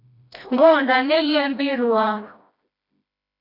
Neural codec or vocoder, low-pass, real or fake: codec, 16 kHz, 1 kbps, FreqCodec, smaller model; 5.4 kHz; fake